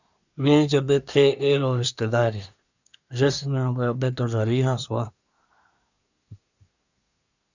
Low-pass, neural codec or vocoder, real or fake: 7.2 kHz; codec, 24 kHz, 1 kbps, SNAC; fake